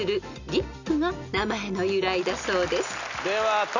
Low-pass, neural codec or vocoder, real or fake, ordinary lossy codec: 7.2 kHz; none; real; none